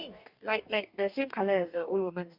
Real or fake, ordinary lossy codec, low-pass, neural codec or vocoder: fake; Opus, 64 kbps; 5.4 kHz; codec, 44.1 kHz, 2.6 kbps, DAC